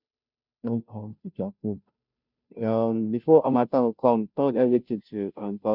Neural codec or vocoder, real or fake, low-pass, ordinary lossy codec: codec, 16 kHz, 0.5 kbps, FunCodec, trained on Chinese and English, 25 frames a second; fake; 5.4 kHz; none